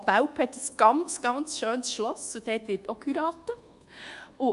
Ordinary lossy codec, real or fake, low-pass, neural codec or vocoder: Opus, 64 kbps; fake; 10.8 kHz; codec, 24 kHz, 1.2 kbps, DualCodec